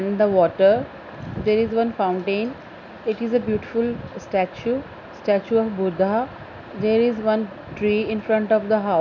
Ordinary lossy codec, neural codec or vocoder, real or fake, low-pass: none; none; real; 7.2 kHz